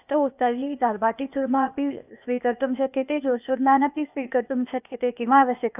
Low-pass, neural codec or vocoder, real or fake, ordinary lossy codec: 3.6 kHz; codec, 16 kHz, 0.8 kbps, ZipCodec; fake; none